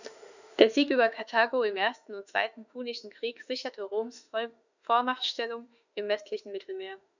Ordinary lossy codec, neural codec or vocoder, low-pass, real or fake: none; autoencoder, 48 kHz, 32 numbers a frame, DAC-VAE, trained on Japanese speech; 7.2 kHz; fake